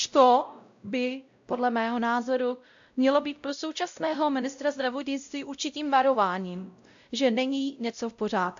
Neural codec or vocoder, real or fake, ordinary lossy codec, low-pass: codec, 16 kHz, 0.5 kbps, X-Codec, WavLM features, trained on Multilingual LibriSpeech; fake; AAC, 64 kbps; 7.2 kHz